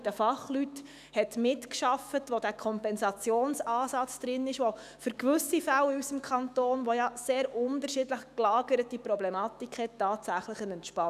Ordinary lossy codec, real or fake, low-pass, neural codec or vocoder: none; fake; 14.4 kHz; autoencoder, 48 kHz, 128 numbers a frame, DAC-VAE, trained on Japanese speech